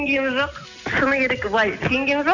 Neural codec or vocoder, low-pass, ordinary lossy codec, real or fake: none; 7.2 kHz; none; real